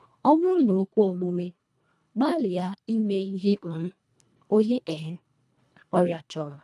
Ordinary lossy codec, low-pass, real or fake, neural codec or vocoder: none; none; fake; codec, 24 kHz, 1.5 kbps, HILCodec